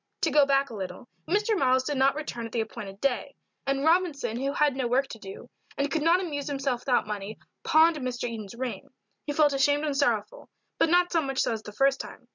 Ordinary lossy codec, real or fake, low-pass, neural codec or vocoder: MP3, 64 kbps; real; 7.2 kHz; none